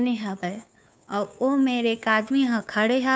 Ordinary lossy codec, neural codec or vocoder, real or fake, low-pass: none; codec, 16 kHz, 2 kbps, FunCodec, trained on Chinese and English, 25 frames a second; fake; none